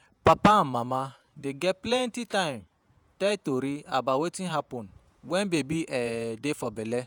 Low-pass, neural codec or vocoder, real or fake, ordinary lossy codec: none; vocoder, 48 kHz, 128 mel bands, Vocos; fake; none